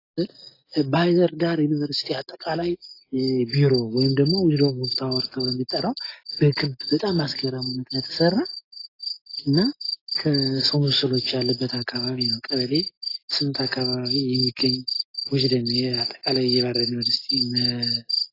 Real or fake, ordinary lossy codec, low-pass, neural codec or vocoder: real; AAC, 32 kbps; 5.4 kHz; none